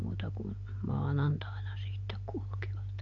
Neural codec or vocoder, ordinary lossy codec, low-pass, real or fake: none; AAC, 48 kbps; 7.2 kHz; real